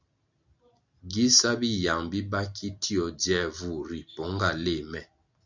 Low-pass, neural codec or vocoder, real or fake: 7.2 kHz; none; real